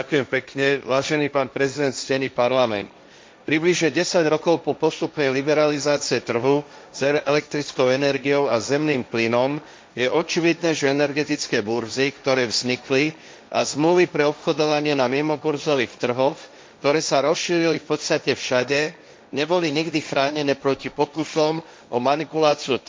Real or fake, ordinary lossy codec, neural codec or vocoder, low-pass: fake; none; codec, 16 kHz, 1.1 kbps, Voila-Tokenizer; none